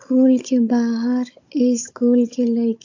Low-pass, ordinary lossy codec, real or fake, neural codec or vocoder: 7.2 kHz; AAC, 48 kbps; fake; codec, 16 kHz, 16 kbps, FunCodec, trained on Chinese and English, 50 frames a second